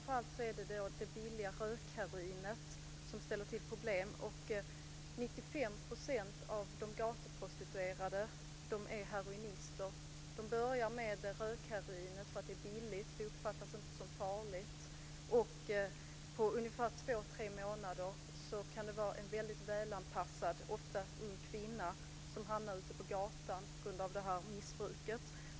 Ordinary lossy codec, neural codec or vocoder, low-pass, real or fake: none; none; none; real